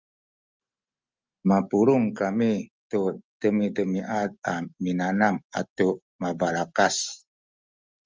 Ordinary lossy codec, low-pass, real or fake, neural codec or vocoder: Opus, 32 kbps; 7.2 kHz; real; none